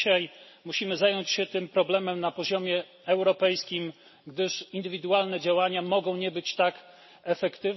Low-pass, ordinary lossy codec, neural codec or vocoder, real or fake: 7.2 kHz; MP3, 24 kbps; none; real